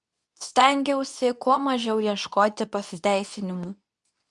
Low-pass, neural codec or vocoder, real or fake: 10.8 kHz; codec, 24 kHz, 0.9 kbps, WavTokenizer, medium speech release version 2; fake